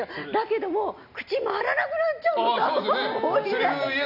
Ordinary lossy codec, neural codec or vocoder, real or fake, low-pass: none; none; real; 5.4 kHz